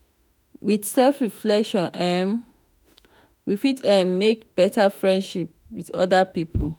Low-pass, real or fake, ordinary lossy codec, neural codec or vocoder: none; fake; none; autoencoder, 48 kHz, 32 numbers a frame, DAC-VAE, trained on Japanese speech